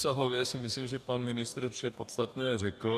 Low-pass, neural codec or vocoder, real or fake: 14.4 kHz; codec, 44.1 kHz, 2.6 kbps, DAC; fake